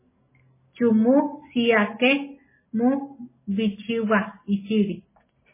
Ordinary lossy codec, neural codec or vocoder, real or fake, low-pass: MP3, 16 kbps; none; real; 3.6 kHz